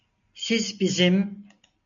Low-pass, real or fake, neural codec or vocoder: 7.2 kHz; real; none